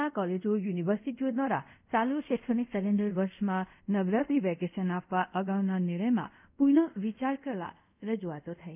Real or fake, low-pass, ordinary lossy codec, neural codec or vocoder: fake; 3.6 kHz; none; codec, 24 kHz, 0.5 kbps, DualCodec